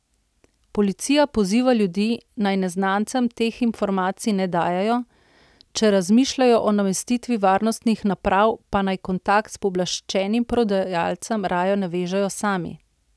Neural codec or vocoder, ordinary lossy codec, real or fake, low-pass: none; none; real; none